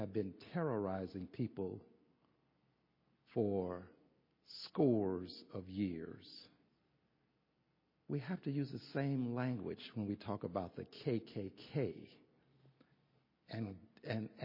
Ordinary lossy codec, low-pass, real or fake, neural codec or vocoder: MP3, 24 kbps; 5.4 kHz; real; none